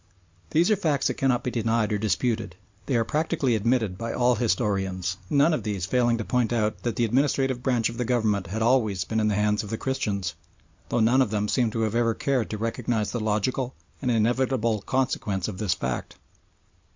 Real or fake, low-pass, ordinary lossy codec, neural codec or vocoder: real; 7.2 kHz; MP3, 64 kbps; none